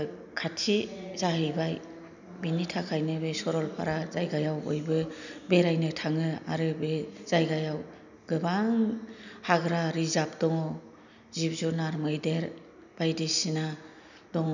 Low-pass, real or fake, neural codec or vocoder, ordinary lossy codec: 7.2 kHz; real; none; none